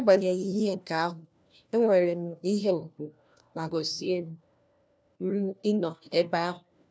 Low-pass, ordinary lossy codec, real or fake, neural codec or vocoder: none; none; fake; codec, 16 kHz, 1 kbps, FunCodec, trained on LibriTTS, 50 frames a second